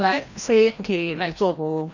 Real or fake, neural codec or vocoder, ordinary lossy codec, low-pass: fake; codec, 16 kHz, 1 kbps, FreqCodec, larger model; none; 7.2 kHz